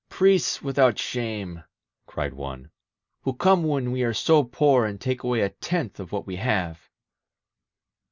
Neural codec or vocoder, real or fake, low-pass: none; real; 7.2 kHz